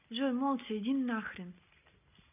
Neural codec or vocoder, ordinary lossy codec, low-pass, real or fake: none; none; 3.6 kHz; real